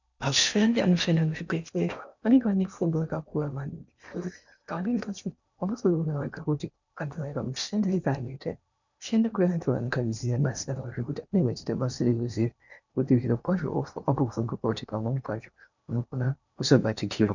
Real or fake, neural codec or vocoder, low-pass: fake; codec, 16 kHz in and 24 kHz out, 0.8 kbps, FocalCodec, streaming, 65536 codes; 7.2 kHz